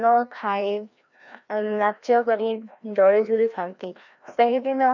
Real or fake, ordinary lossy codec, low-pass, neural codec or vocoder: fake; none; 7.2 kHz; codec, 16 kHz, 1 kbps, FreqCodec, larger model